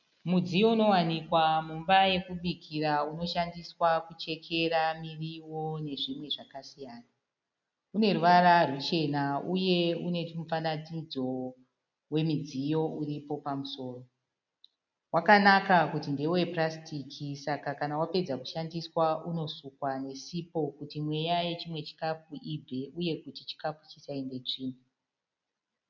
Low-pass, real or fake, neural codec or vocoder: 7.2 kHz; real; none